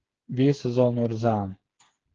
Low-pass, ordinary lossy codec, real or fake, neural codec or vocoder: 7.2 kHz; Opus, 16 kbps; fake; codec, 16 kHz, 4 kbps, FreqCodec, smaller model